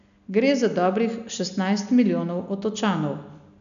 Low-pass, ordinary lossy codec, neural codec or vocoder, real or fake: 7.2 kHz; MP3, 96 kbps; none; real